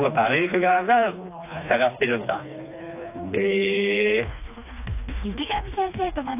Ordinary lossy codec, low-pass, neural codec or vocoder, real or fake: AAC, 32 kbps; 3.6 kHz; codec, 16 kHz, 2 kbps, FreqCodec, smaller model; fake